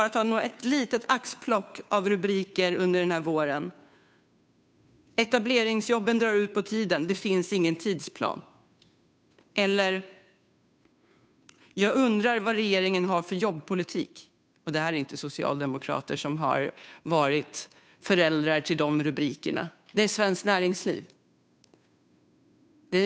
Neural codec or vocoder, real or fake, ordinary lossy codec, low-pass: codec, 16 kHz, 2 kbps, FunCodec, trained on Chinese and English, 25 frames a second; fake; none; none